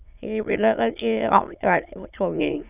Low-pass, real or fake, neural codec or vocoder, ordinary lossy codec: 3.6 kHz; fake; autoencoder, 22.05 kHz, a latent of 192 numbers a frame, VITS, trained on many speakers; none